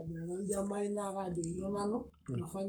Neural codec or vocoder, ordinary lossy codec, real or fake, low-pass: codec, 44.1 kHz, 7.8 kbps, Pupu-Codec; none; fake; none